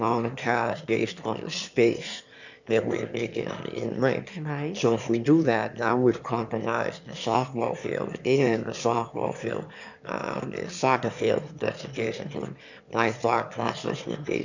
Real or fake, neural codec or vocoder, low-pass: fake; autoencoder, 22.05 kHz, a latent of 192 numbers a frame, VITS, trained on one speaker; 7.2 kHz